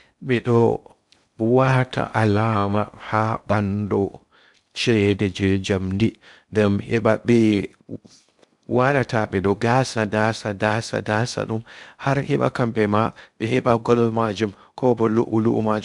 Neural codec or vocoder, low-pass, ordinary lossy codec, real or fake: codec, 16 kHz in and 24 kHz out, 0.6 kbps, FocalCodec, streaming, 2048 codes; 10.8 kHz; none; fake